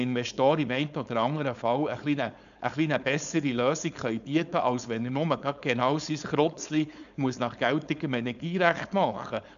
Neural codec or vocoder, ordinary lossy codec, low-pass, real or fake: codec, 16 kHz, 4.8 kbps, FACodec; none; 7.2 kHz; fake